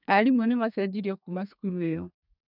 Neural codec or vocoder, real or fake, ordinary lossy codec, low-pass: codec, 32 kHz, 1.9 kbps, SNAC; fake; none; 5.4 kHz